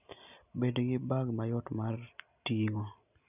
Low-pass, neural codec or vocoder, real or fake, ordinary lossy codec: 3.6 kHz; none; real; none